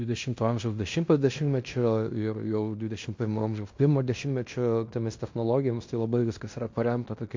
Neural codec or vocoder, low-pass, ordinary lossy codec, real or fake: codec, 16 kHz in and 24 kHz out, 0.9 kbps, LongCat-Audio-Codec, fine tuned four codebook decoder; 7.2 kHz; MP3, 48 kbps; fake